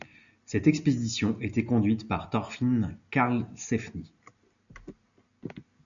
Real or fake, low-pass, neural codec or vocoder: real; 7.2 kHz; none